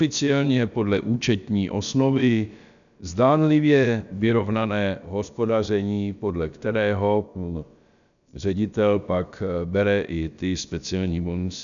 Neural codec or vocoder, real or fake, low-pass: codec, 16 kHz, about 1 kbps, DyCAST, with the encoder's durations; fake; 7.2 kHz